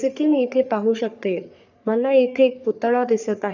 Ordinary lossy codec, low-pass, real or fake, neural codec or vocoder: none; 7.2 kHz; fake; codec, 44.1 kHz, 3.4 kbps, Pupu-Codec